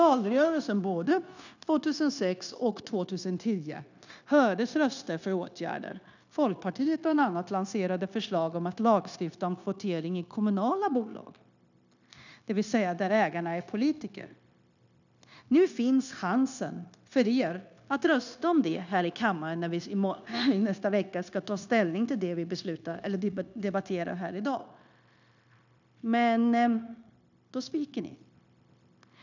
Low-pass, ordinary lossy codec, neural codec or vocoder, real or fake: 7.2 kHz; none; codec, 16 kHz, 0.9 kbps, LongCat-Audio-Codec; fake